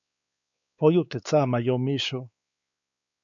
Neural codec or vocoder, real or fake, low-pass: codec, 16 kHz, 4 kbps, X-Codec, WavLM features, trained on Multilingual LibriSpeech; fake; 7.2 kHz